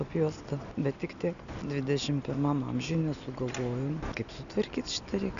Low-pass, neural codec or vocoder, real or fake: 7.2 kHz; none; real